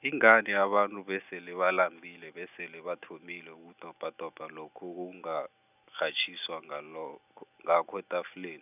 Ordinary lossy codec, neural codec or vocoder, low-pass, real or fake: none; none; 3.6 kHz; real